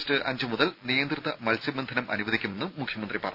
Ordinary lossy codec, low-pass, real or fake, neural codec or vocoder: none; 5.4 kHz; real; none